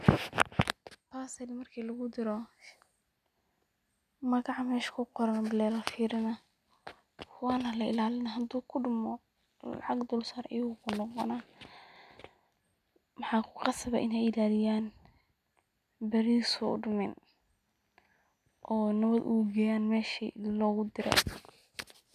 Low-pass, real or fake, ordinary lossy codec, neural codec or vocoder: 14.4 kHz; real; none; none